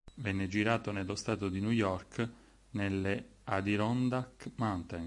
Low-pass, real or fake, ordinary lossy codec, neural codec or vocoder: 10.8 kHz; real; MP3, 64 kbps; none